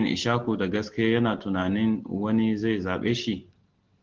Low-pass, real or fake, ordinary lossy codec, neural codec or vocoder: 7.2 kHz; real; Opus, 16 kbps; none